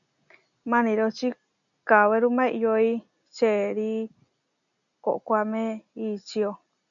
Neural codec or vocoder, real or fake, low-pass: none; real; 7.2 kHz